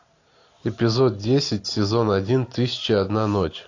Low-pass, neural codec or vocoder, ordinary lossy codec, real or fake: 7.2 kHz; none; AAC, 48 kbps; real